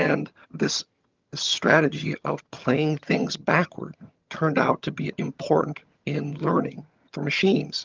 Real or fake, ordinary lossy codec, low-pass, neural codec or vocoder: fake; Opus, 32 kbps; 7.2 kHz; vocoder, 22.05 kHz, 80 mel bands, HiFi-GAN